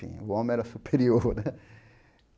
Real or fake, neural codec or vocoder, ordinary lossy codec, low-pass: real; none; none; none